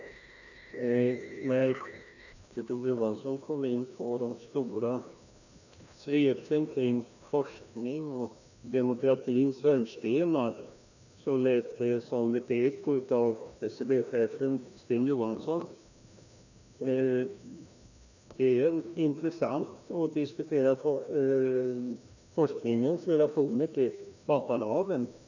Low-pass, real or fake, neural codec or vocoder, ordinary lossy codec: 7.2 kHz; fake; codec, 16 kHz, 1 kbps, FreqCodec, larger model; none